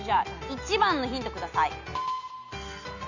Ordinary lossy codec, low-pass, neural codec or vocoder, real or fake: none; 7.2 kHz; none; real